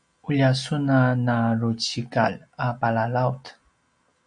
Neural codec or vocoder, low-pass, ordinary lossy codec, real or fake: none; 9.9 kHz; MP3, 64 kbps; real